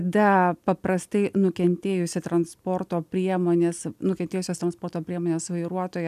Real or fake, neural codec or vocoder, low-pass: real; none; 14.4 kHz